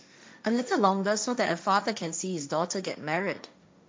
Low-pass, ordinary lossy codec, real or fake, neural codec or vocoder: none; none; fake; codec, 16 kHz, 1.1 kbps, Voila-Tokenizer